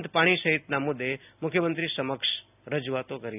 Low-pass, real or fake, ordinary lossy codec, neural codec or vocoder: 3.6 kHz; real; none; none